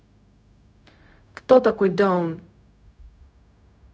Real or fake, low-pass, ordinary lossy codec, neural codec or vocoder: fake; none; none; codec, 16 kHz, 0.4 kbps, LongCat-Audio-Codec